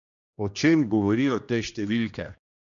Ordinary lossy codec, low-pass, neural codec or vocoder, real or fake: none; 7.2 kHz; codec, 16 kHz, 1 kbps, X-Codec, HuBERT features, trained on general audio; fake